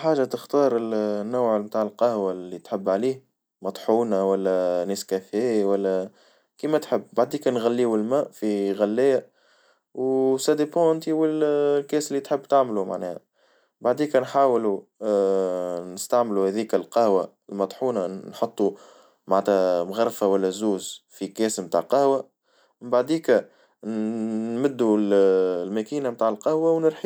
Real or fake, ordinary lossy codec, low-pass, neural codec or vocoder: real; none; none; none